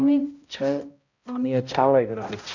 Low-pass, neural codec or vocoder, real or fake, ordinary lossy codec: 7.2 kHz; codec, 16 kHz, 0.5 kbps, X-Codec, HuBERT features, trained on balanced general audio; fake; none